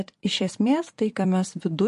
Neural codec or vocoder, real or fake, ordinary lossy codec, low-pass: vocoder, 44.1 kHz, 128 mel bands every 256 samples, BigVGAN v2; fake; MP3, 48 kbps; 14.4 kHz